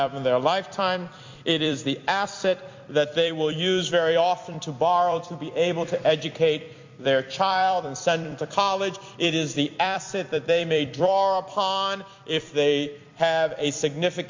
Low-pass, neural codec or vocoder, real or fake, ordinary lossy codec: 7.2 kHz; vocoder, 44.1 kHz, 128 mel bands every 256 samples, BigVGAN v2; fake; MP3, 48 kbps